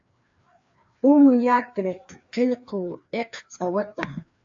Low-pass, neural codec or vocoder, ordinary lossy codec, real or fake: 7.2 kHz; codec, 16 kHz, 2 kbps, FreqCodec, larger model; AAC, 64 kbps; fake